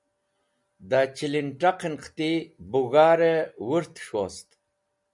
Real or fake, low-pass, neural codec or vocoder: real; 10.8 kHz; none